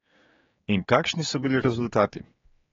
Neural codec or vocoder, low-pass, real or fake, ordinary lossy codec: codec, 16 kHz, 4 kbps, X-Codec, HuBERT features, trained on general audio; 7.2 kHz; fake; AAC, 24 kbps